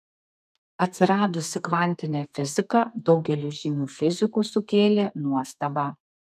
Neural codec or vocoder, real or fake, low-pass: codec, 32 kHz, 1.9 kbps, SNAC; fake; 14.4 kHz